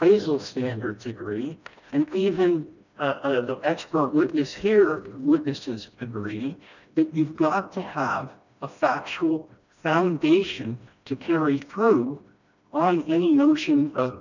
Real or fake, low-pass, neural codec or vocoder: fake; 7.2 kHz; codec, 16 kHz, 1 kbps, FreqCodec, smaller model